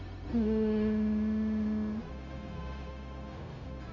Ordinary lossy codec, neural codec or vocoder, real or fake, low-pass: MP3, 64 kbps; codec, 16 kHz, 0.4 kbps, LongCat-Audio-Codec; fake; 7.2 kHz